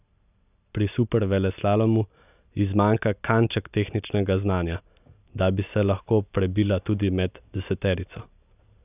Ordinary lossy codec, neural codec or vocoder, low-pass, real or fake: none; none; 3.6 kHz; real